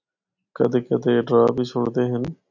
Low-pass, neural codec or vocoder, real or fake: 7.2 kHz; none; real